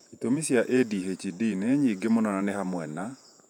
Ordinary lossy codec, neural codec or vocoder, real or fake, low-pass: none; vocoder, 48 kHz, 128 mel bands, Vocos; fake; 19.8 kHz